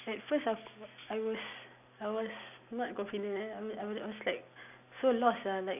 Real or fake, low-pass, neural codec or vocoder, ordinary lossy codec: real; 3.6 kHz; none; none